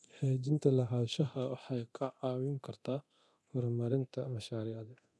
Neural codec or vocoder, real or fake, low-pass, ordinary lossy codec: codec, 24 kHz, 0.9 kbps, DualCodec; fake; none; none